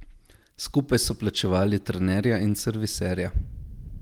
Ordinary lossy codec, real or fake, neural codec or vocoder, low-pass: Opus, 24 kbps; real; none; 19.8 kHz